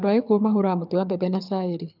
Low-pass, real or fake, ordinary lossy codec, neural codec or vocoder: 5.4 kHz; fake; none; codec, 24 kHz, 6 kbps, HILCodec